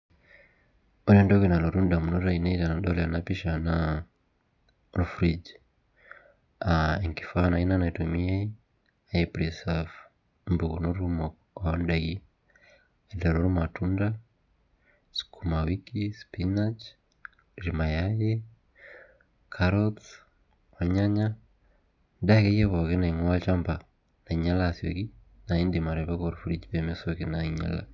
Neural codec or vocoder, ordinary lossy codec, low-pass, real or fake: none; none; 7.2 kHz; real